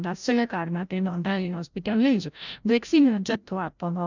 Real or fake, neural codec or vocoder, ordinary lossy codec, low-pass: fake; codec, 16 kHz, 0.5 kbps, FreqCodec, larger model; none; 7.2 kHz